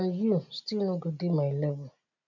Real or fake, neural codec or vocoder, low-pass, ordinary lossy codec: real; none; 7.2 kHz; none